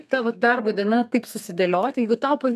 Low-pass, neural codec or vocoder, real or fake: 14.4 kHz; codec, 32 kHz, 1.9 kbps, SNAC; fake